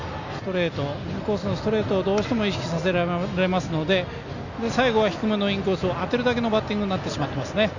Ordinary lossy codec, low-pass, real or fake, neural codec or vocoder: none; 7.2 kHz; real; none